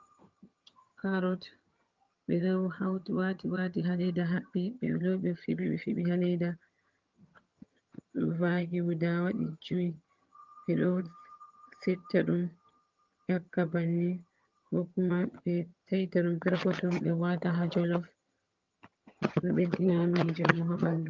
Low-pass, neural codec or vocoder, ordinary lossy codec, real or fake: 7.2 kHz; vocoder, 22.05 kHz, 80 mel bands, HiFi-GAN; Opus, 32 kbps; fake